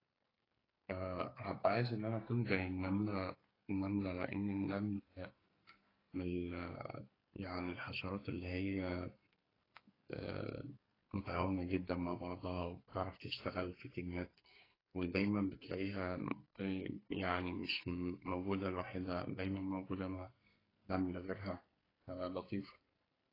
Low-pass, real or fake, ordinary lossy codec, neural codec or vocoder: 5.4 kHz; fake; AAC, 24 kbps; codec, 44.1 kHz, 2.6 kbps, SNAC